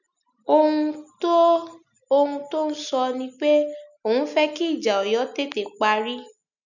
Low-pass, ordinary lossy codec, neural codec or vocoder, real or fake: 7.2 kHz; none; none; real